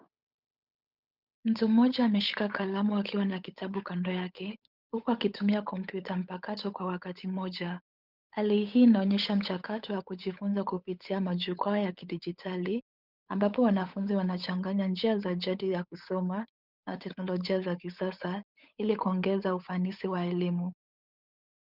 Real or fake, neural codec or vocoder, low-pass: fake; codec, 16 kHz, 8 kbps, FunCodec, trained on Chinese and English, 25 frames a second; 5.4 kHz